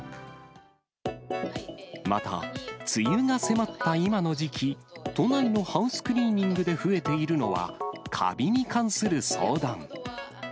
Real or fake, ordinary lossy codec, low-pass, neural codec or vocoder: real; none; none; none